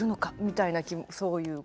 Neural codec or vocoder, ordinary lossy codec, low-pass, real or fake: none; none; none; real